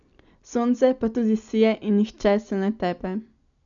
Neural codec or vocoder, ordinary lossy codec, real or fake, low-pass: none; none; real; 7.2 kHz